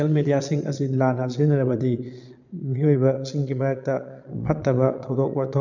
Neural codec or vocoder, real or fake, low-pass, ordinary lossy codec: vocoder, 22.05 kHz, 80 mel bands, Vocos; fake; 7.2 kHz; none